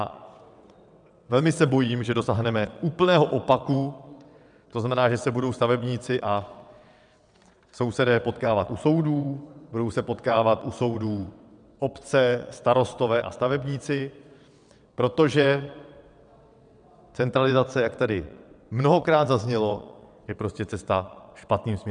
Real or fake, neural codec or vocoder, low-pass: fake; vocoder, 22.05 kHz, 80 mel bands, WaveNeXt; 9.9 kHz